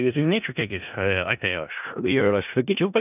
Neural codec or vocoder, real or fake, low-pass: codec, 16 kHz in and 24 kHz out, 0.4 kbps, LongCat-Audio-Codec, four codebook decoder; fake; 3.6 kHz